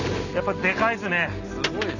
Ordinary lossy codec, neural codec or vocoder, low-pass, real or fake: none; none; 7.2 kHz; real